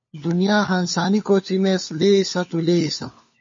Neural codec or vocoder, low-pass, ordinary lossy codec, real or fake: codec, 16 kHz, 4 kbps, FunCodec, trained on LibriTTS, 50 frames a second; 7.2 kHz; MP3, 32 kbps; fake